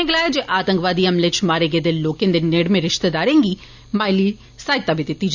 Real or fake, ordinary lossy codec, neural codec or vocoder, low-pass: real; none; none; 7.2 kHz